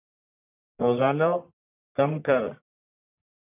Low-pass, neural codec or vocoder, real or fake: 3.6 kHz; codec, 44.1 kHz, 1.7 kbps, Pupu-Codec; fake